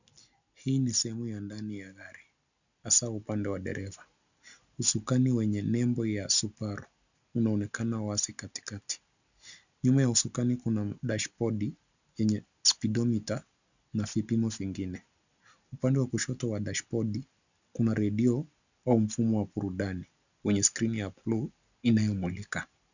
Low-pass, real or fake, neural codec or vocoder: 7.2 kHz; real; none